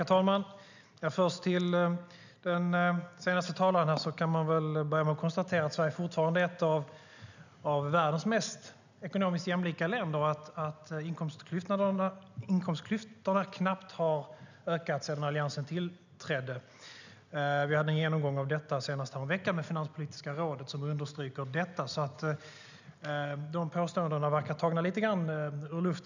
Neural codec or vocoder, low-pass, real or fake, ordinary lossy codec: none; 7.2 kHz; real; none